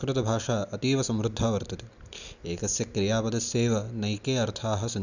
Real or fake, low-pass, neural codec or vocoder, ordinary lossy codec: real; 7.2 kHz; none; none